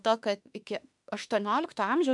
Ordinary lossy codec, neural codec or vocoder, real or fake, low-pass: MP3, 96 kbps; autoencoder, 48 kHz, 32 numbers a frame, DAC-VAE, trained on Japanese speech; fake; 10.8 kHz